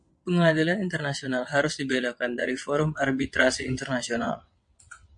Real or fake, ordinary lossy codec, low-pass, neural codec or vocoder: fake; MP3, 48 kbps; 9.9 kHz; vocoder, 22.05 kHz, 80 mel bands, WaveNeXt